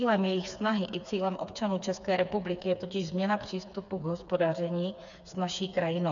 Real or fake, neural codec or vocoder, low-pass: fake; codec, 16 kHz, 4 kbps, FreqCodec, smaller model; 7.2 kHz